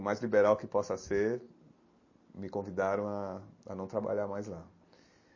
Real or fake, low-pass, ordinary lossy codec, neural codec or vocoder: real; 7.2 kHz; MP3, 32 kbps; none